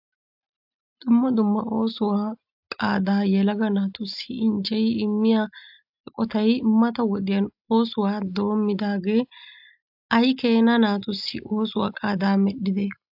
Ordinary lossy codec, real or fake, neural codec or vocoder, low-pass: AAC, 48 kbps; real; none; 5.4 kHz